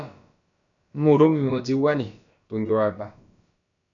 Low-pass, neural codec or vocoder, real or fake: 7.2 kHz; codec, 16 kHz, about 1 kbps, DyCAST, with the encoder's durations; fake